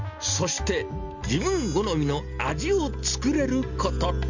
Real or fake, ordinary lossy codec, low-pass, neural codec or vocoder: real; none; 7.2 kHz; none